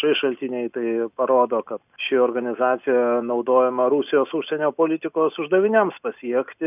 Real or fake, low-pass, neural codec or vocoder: real; 3.6 kHz; none